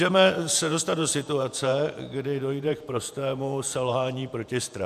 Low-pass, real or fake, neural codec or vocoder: 14.4 kHz; fake; vocoder, 48 kHz, 128 mel bands, Vocos